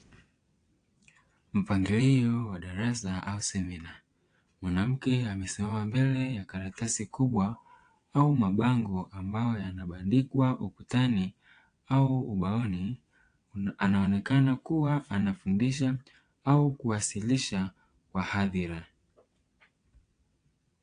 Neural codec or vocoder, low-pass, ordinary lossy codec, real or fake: vocoder, 22.05 kHz, 80 mel bands, WaveNeXt; 9.9 kHz; AAC, 48 kbps; fake